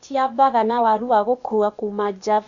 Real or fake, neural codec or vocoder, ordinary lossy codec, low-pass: fake; codec, 16 kHz, 0.8 kbps, ZipCodec; none; 7.2 kHz